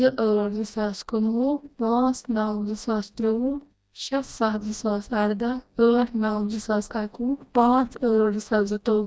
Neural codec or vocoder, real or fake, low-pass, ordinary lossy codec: codec, 16 kHz, 1 kbps, FreqCodec, smaller model; fake; none; none